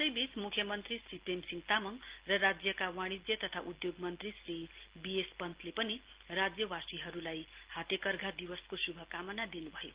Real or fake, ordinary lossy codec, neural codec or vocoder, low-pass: real; Opus, 16 kbps; none; 3.6 kHz